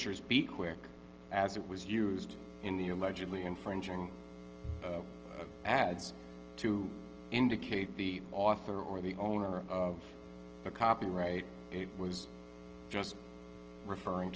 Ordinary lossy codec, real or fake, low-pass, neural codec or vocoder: Opus, 16 kbps; real; 7.2 kHz; none